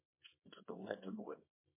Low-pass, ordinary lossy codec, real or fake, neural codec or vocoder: 3.6 kHz; MP3, 24 kbps; fake; codec, 24 kHz, 0.9 kbps, WavTokenizer, small release